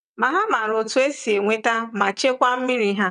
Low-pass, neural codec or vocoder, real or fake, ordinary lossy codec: 9.9 kHz; vocoder, 22.05 kHz, 80 mel bands, WaveNeXt; fake; AAC, 96 kbps